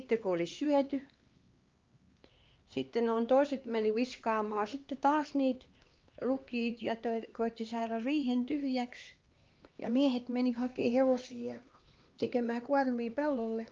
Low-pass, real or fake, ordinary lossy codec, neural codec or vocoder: 7.2 kHz; fake; Opus, 24 kbps; codec, 16 kHz, 2 kbps, X-Codec, HuBERT features, trained on LibriSpeech